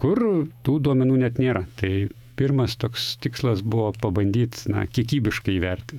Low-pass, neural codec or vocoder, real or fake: 19.8 kHz; autoencoder, 48 kHz, 128 numbers a frame, DAC-VAE, trained on Japanese speech; fake